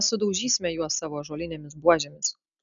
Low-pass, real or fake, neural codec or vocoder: 7.2 kHz; real; none